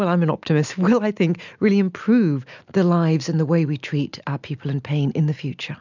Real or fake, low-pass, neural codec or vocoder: real; 7.2 kHz; none